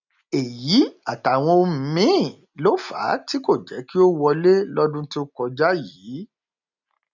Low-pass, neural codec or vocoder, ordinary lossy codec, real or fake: 7.2 kHz; none; none; real